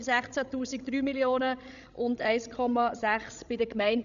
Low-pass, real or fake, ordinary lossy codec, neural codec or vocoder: 7.2 kHz; fake; none; codec, 16 kHz, 16 kbps, FreqCodec, larger model